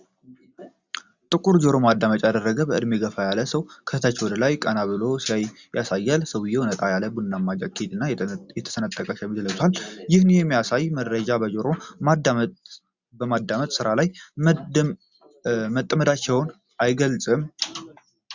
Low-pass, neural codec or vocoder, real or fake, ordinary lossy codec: 7.2 kHz; none; real; Opus, 64 kbps